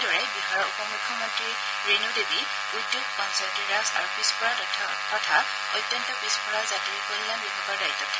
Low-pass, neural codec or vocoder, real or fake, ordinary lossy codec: none; none; real; none